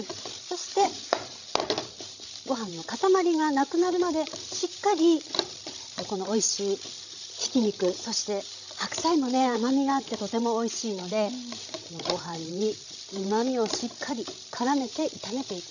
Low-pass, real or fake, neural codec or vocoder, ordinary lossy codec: 7.2 kHz; fake; codec, 16 kHz, 16 kbps, FreqCodec, larger model; none